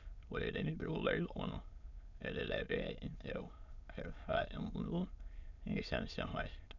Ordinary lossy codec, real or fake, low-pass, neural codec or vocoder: none; fake; 7.2 kHz; autoencoder, 22.05 kHz, a latent of 192 numbers a frame, VITS, trained on many speakers